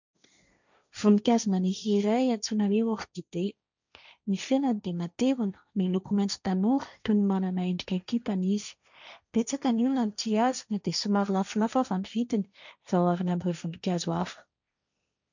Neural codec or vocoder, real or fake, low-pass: codec, 16 kHz, 1.1 kbps, Voila-Tokenizer; fake; 7.2 kHz